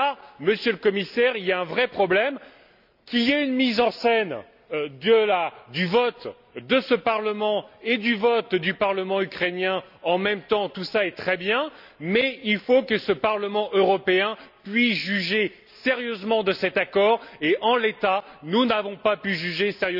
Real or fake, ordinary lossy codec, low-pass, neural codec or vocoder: real; none; 5.4 kHz; none